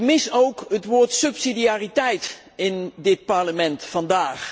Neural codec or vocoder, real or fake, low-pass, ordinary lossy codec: none; real; none; none